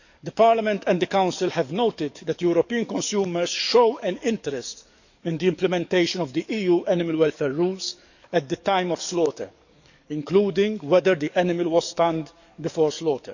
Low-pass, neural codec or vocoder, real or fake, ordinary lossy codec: 7.2 kHz; codec, 44.1 kHz, 7.8 kbps, DAC; fake; none